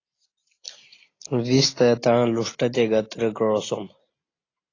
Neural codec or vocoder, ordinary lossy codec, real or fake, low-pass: none; AAC, 32 kbps; real; 7.2 kHz